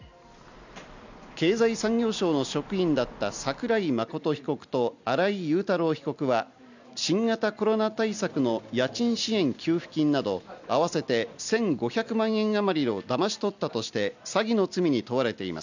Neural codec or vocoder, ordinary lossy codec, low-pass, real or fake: none; none; 7.2 kHz; real